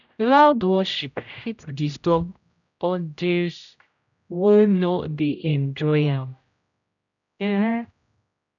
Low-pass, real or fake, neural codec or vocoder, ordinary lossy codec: 7.2 kHz; fake; codec, 16 kHz, 0.5 kbps, X-Codec, HuBERT features, trained on general audio; none